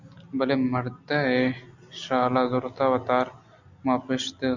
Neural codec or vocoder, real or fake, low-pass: none; real; 7.2 kHz